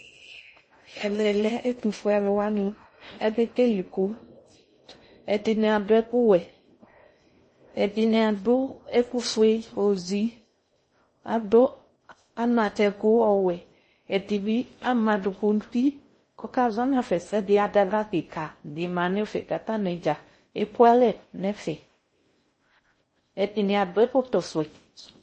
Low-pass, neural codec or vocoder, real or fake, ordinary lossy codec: 9.9 kHz; codec, 16 kHz in and 24 kHz out, 0.6 kbps, FocalCodec, streaming, 2048 codes; fake; MP3, 32 kbps